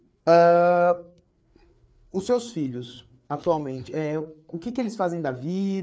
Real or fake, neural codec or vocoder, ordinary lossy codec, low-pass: fake; codec, 16 kHz, 4 kbps, FreqCodec, larger model; none; none